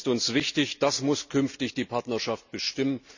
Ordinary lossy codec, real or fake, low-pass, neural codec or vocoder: none; real; 7.2 kHz; none